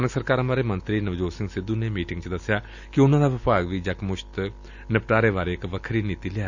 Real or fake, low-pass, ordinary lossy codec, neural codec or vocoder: real; 7.2 kHz; none; none